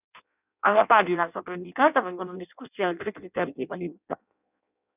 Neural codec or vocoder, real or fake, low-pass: codec, 16 kHz in and 24 kHz out, 0.6 kbps, FireRedTTS-2 codec; fake; 3.6 kHz